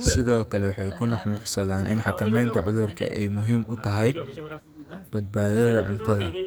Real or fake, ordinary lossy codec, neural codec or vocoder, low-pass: fake; none; codec, 44.1 kHz, 2.6 kbps, SNAC; none